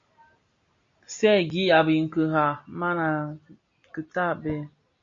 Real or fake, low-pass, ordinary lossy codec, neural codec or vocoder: real; 7.2 kHz; MP3, 48 kbps; none